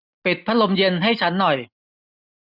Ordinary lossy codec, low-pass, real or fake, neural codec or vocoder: none; 5.4 kHz; real; none